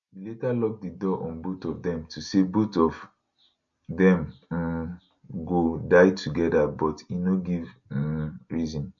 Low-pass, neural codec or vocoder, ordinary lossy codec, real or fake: 7.2 kHz; none; none; real